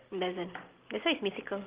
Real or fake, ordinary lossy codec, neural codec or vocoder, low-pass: real; Opus, 16 kbps; none; 3.6 kHz